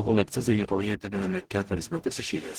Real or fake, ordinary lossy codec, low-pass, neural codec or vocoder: fake; Opus, 16 kbps; 19.8 kHz; codec, 44.1 kHz, 0.9 kbps, DAC